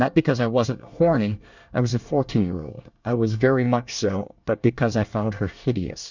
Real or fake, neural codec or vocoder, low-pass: fake; codec, 24 kHz, 1 kbps, SNAC; 7.2 kHz